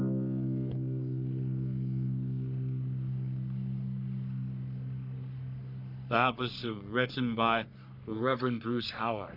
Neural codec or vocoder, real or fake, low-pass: codec, 44.1 kHz, 3.4 kbps, Pupu-Codec; fake; 5.4 kHz